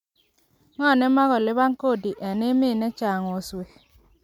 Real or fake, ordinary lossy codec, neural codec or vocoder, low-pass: real; MP3, 96 kbps; none; 19.8 kHz